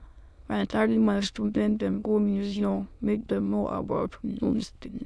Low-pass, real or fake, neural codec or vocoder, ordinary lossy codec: none; fake; autoencoder, 22.05 kHz, a latent of 192 numbers a frame, VITS, trained on many speakers; none